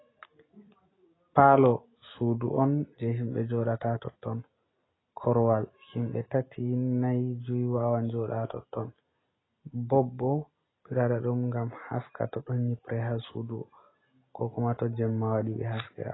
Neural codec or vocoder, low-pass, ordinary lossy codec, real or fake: none; 7.2 kHz; AAC, 16 kbps; real